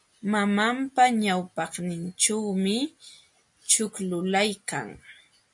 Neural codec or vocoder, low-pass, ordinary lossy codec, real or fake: none; 10.8 kHz; MP3, 48 kbps; real